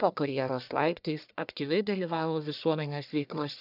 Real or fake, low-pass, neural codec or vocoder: fake; 5.4 kHz; codec, 44.1 kHz, 1.7 kbps, Pupu-Codec